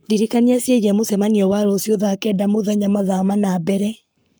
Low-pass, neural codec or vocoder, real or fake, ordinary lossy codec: none; codec, 44.1 kHz, 7.8 kbps, Pupu-Codec; fake; none